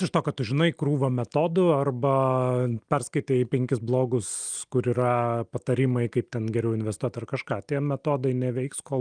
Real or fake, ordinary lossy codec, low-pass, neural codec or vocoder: real; Opus, 32 kbps; 9.9 kHz; none